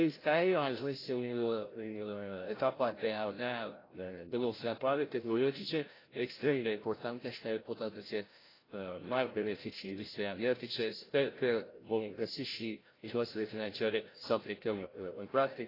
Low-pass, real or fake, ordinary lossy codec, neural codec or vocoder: 5.4 kHz; fake; AAC, 24 kbps; codec, 16 kHz, 0.5 kbps, FreqCodec, larger model